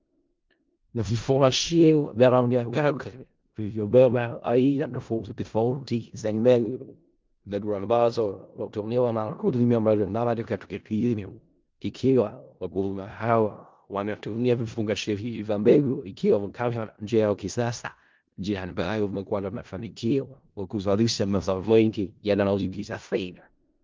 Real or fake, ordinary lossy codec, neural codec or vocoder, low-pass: fake; Opus, 32 kbps; codec, 16 kHz in and 24 kHz out, 0.4 kbps, LongCat-Audio-Codec, four codebook decoder; 7.2 kHz